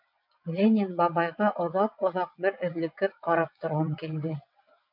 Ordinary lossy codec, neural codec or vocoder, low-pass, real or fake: MP3, 48 kbps; vocoder, 22.05 kHz, 80 mel bands, WaveNeXt; 5.4 kHz; fake